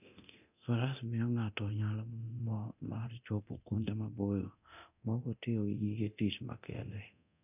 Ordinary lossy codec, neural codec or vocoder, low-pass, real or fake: none; codec, 24 kHz, 0.9 kbps, DualCodec; 3.6 kHz; fake